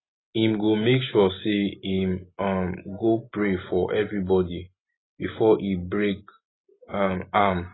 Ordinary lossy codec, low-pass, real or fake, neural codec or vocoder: AAC, 16 kbps; 7.2 kHz; real; none